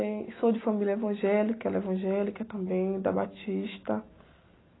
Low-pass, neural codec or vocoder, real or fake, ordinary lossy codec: 7.2 kHz; none; real; AAC, 16 kbps